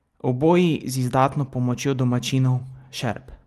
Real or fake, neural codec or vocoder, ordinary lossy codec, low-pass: real; none; Opus, 32 kbps; 14.4 kHz